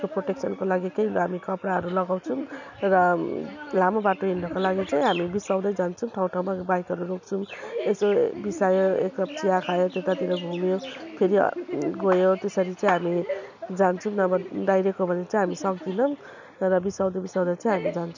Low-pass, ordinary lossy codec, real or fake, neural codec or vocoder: 7.2 kHz; none; real; none